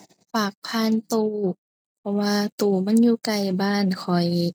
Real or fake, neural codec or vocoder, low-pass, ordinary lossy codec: real; none; none; none